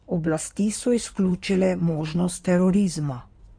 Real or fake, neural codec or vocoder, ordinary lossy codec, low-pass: fake; codec, 16 kHz in and 24 kHz out, 2.2 kbps, FireRedTTS-2 codec; none; 9.9 kHz